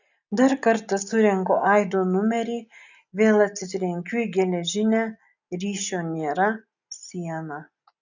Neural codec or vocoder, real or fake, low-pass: none; real; 7.2 kHz